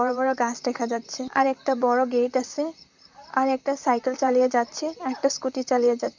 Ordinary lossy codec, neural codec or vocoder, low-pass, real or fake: none; vocoder, 44.1 kHz, 128 mel bands, Pupu-Vocoder; 7.2 kHz; fake